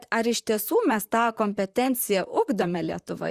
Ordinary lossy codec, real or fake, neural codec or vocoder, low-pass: Opus, 64 kbps; fake; vocoder, 44.1 kHz, 128 mel bands, Pupu-Vocoder; 14.4 kHz